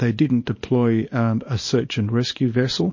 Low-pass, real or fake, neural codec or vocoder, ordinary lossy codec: 7.2 kHz; fake; codec, 24 kHz, 0.9 kbps, WavTokenizer, small release; MP3, 32 kbps